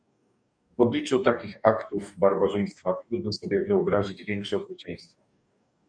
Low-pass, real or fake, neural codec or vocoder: 9.9 kHz; fake; codec, 44.1 kHz, 2.6 kbps, DAC